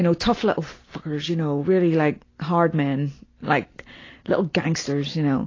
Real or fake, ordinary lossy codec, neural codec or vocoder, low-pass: real; AAC, 32 kbps; none; 7.2 kHz